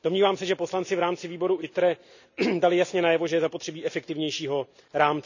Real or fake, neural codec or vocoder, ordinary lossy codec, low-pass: real; none; none; 7.2 kHz